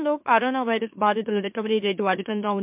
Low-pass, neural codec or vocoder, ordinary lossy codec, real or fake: 3.6 kHz; autoencoder, 44.1 kHz, a latent of 192 numbers a frame, MeloTTS; MP3, 32 kbps; fake